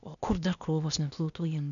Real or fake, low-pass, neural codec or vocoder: fake; 7.2 kHz; codec, 16 kHz, 0.8 kbps, ZipCodec